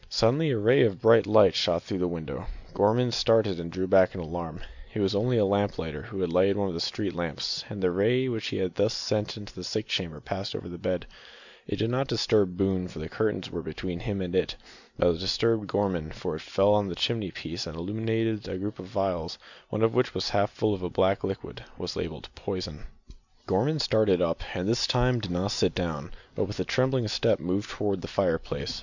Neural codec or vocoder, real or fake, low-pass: none; real; 7.2 kHz